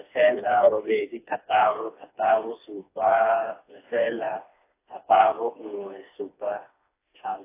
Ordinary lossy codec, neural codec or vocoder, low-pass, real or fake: AAC, 24 kbps; codec, 16 kHz, 2 kbps, FreqCodec, smaller model; 3.6 kHz; fake